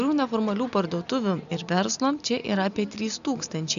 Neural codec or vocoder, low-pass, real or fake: none; 7.2 kHz; real